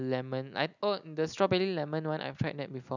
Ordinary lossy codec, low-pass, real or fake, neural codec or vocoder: none; 7.2 kHz; real; none